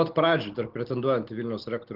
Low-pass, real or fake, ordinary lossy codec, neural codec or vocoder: 5.4 kHz; real; Opus, 16 kbps; none